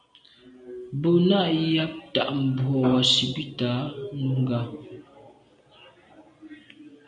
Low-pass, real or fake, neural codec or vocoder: 9.9 kHz; real; none